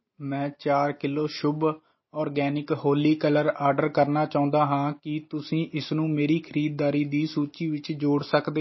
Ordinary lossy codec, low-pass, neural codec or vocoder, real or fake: MP3, 24 kbps; 7.2 kHz; none; real